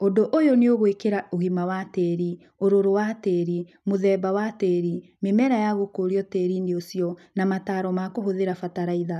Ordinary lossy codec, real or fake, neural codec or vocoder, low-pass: none; real; none; 14.4 kHz